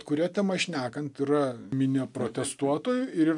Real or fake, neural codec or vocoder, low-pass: real; none; 10.8 kHz